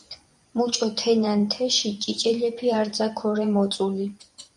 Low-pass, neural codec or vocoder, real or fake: 10.8 kHz; vocoder, 24 kHz, 100 mel bands, Vocos; fake